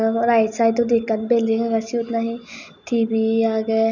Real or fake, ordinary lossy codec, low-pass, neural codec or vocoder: real; none; 7.2 kHz; none